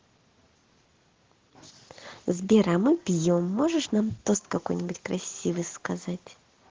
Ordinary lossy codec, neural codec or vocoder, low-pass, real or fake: Opus, 16 kbps; none; 7.2 kHz; real